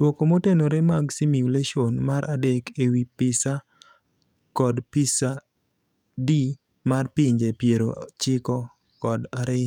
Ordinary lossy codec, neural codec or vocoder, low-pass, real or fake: none; codec, 44.1 kHz, 7.8 kbps, DAC; 19.8 kHz; fake